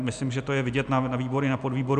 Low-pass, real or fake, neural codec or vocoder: 9.9 kHz; real; none